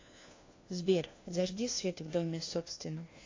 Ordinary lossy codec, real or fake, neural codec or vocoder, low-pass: AAC, 32 kbps; fake; codec, 16 kHz, 1 kbps, FunCodec, trained on LibriTTS, 50 frames a second; 7.2 kHz